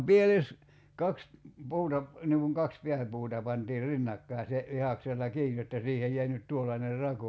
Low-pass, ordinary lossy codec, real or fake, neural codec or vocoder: none; none; real; none